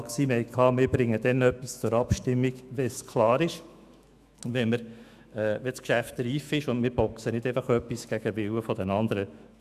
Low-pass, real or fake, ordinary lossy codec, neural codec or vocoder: 14.4 kHz; fake; none; autoencoder, 48 kHz, 128 numbers a frame, DAC-VAE, trained on Japanese speech